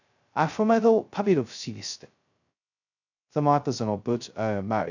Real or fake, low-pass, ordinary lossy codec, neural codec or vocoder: fake; 7.2 kHz; none; codec, 16 kHz, 0.2 kbps, FocalCodec